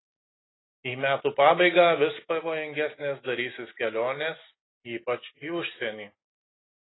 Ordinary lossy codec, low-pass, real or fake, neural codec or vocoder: AAC, 16 kbps; 7.2 kHz; real; none